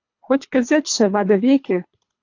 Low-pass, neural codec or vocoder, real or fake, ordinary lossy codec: 7.2 kHz; codec, 24 kHz, 3 kbps, HILCodec; fake; AAC, 48 kbps